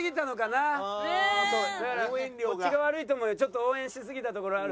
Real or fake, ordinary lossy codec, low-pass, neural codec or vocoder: real; none; none; none